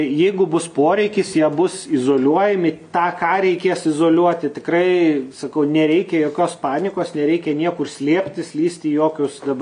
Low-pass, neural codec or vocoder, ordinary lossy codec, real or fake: 9.9 kHz; none; MP3, 64 kbps; real